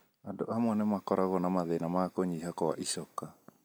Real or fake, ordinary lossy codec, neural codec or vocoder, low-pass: real; none; none; none